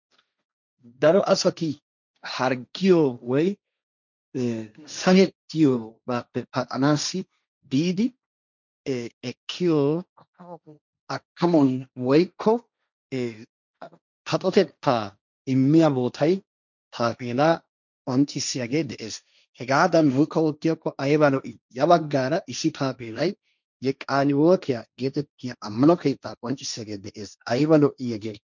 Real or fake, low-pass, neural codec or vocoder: fake; 7.2 kHz; codec, 16 kHz, 1.1 kbps, Voila-Tokenizer